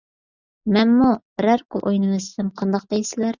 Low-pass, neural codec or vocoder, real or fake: 7.2 kHz; none; real